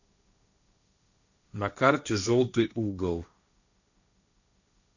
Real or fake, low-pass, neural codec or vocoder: fake; 7.2 kHz; codec, 16 kHz, 1.1 kbps, Voila-Tokenizer